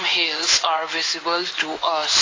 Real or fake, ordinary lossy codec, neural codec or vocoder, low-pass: fake; AAC, 32 kbps; codec, 16 kHz in and 24 kHz out, 1 kbps, XY-Tokenizer; 7.2 kHz